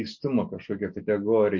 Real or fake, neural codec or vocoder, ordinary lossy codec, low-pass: real; none; MP3, 48 kbps; 7.2 kHz